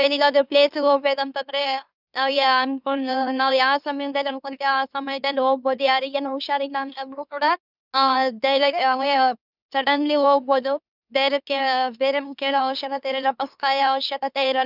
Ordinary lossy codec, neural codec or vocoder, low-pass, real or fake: none; autoencoder, 44.1 kHz, a latent of 192 numbers a frame, MeloTTS; 5.4 kHz; fake